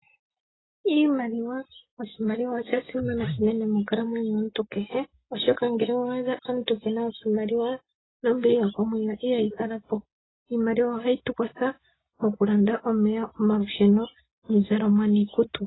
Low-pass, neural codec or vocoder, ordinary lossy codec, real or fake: 7.2 kHz; none; AAC, 16 kbps; real